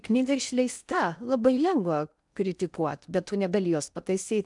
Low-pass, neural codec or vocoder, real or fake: 10.8 kHz; codec, 16 kHz in and 24 kHz out, 0.8 kbps, FocalCodec, streaming, 65536 codes; fake